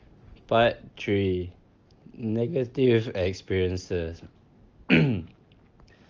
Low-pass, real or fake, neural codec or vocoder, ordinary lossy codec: 7.2 kHz; real; none; Opus, 24 kbps